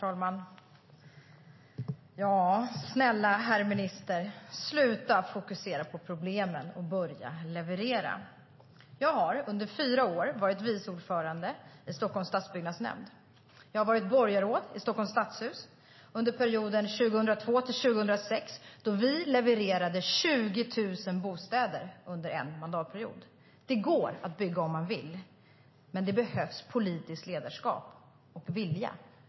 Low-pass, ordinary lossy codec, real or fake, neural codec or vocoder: 7.2 kHz; MP3, 24 kbps; real; none